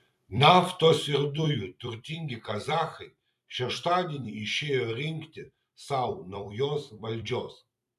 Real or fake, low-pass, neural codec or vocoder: fake; 14.4 kHz; vocoder, 44.1 kHz, 128 mel bands every 256 samples, BigVGAN v2